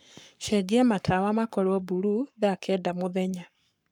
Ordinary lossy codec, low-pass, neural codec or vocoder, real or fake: none; 19.8 kHz; codec, 44.1 kHz, 7.8 kbps, Pupu-Codec; fake